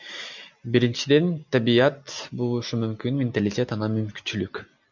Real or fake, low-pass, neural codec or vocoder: real; 7.2 kHz; none